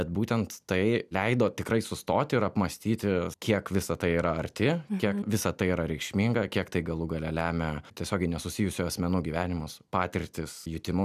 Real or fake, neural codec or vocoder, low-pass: real; none; 14.4 kHz